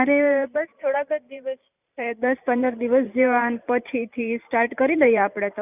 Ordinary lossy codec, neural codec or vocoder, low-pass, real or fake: none; vocoder, 44.1 kHz, 128 mel bands every 512 samples, BigVGAN v2; 3.6 kHz; fake